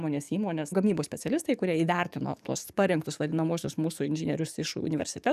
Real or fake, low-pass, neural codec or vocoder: fake; 14.4 kHz; codec, 44.1 kHz, 7.8 kbps, DAC